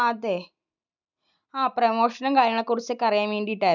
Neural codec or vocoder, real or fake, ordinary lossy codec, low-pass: none; real; none; 7.2 kHz